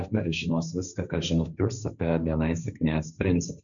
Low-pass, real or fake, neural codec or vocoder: 7.2 kHz; fake; codec, 16 kHz, 1.1 kbps, Voila-Tokenizer